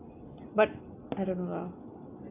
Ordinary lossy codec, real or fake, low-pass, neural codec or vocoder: AAC, 24 kbps; real; 3.6 kHz; none